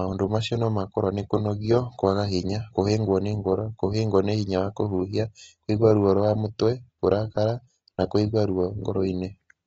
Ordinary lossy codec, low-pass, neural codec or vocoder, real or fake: AAC, 24 kbps; 14.4 kHz; none; real